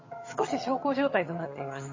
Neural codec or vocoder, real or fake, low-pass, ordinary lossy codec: vocoder, 22.05 kHz, 80 mel bands, HiFi-GAN; fake; 7.2 kHz; MP3, 32 kbps